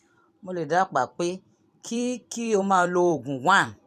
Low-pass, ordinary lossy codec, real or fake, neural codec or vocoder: 14.4 kHz; none; fake; vocoder, 44.1 kHz, 128 mel bands every 256 samples, BigVGAN v2